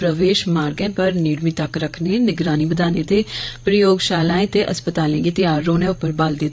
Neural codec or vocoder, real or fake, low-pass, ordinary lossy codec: codec, 16 kHz, 8 kbps, FreqCodec, larger model; fake; none; none